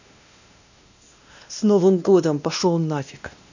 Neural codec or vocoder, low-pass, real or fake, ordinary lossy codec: codec, 16 kHz, 1 kbps, X-Codec, WavLM features, trained on Multilingual LibriSpeech; 7.2 kHz; fake; none